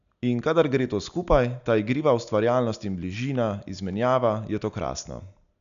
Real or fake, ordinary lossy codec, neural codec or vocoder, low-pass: real; none; none; 7.2 kHz